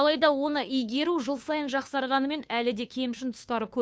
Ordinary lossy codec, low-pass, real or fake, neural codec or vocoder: none; none; fake; codec, 16 kHz, 2 kbps, FunCodec, trained on Chinese and English, 25 frames a second